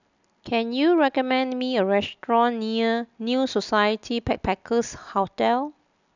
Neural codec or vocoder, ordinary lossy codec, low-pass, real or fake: none; none; 7.2 kHz; real